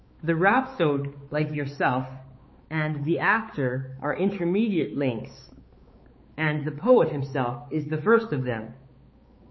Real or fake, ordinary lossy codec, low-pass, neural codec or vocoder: fake; MP3, 24 kbps; 7.2 kHz; codec, 16 kHz, 4 kbps, X-Codec, HuBERT features, trained on balanced general audio